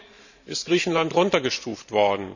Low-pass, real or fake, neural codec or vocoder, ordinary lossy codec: 7.2 kHz; fake; vocoder, 44.1 kHz, 128 mel bands every 512 samples, BigVGAN v2; none